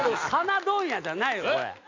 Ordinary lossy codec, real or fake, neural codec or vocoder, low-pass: MP3, 64 kbps; real; none; 7.2 kHz